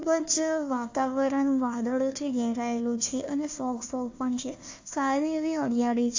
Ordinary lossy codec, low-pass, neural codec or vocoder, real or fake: AAC, 48 kbps; 7.2 kHz; codec, 16 kHz, 1 kbps, FunCodec, trained on Chinese and English, 50 frames a second; fake